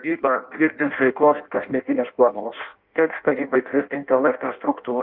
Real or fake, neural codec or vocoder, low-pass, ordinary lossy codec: fake; codec, 16 kHz in and 24 kHz out, 0.6 kbps, FireRedTTS-2 codec; 5.4 kHz; Opus, 32 kbps